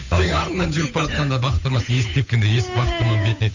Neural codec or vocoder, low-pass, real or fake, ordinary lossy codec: codec, 16 kHz, 8 kbps, FunCodec, trained on Chinese and English, 25 frames a second; 7.2 kHz; fake; none